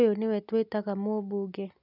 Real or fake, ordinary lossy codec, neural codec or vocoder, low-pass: real; none; none; 5.4 kHz